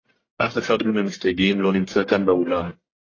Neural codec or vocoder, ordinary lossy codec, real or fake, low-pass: codec, 44.1 kHz, 1.7 kbps, Pupu-Codec; AAC, 32 kbps; fake; 7.2 kHz